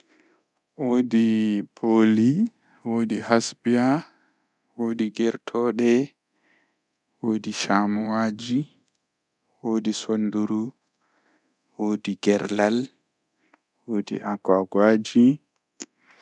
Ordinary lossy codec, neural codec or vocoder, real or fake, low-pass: none; codec, 24 kHz, 0.9 kbps, DualCodec; fake; 10.8 kHz